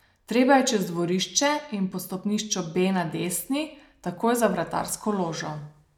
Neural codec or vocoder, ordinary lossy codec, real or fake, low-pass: none; none; real; 19.8 kHz